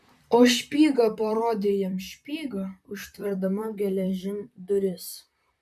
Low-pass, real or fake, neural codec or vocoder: 14.4 kHz; fake; vocoder, 44.1 kHz, 128 mel bands, Pupu-Vocoder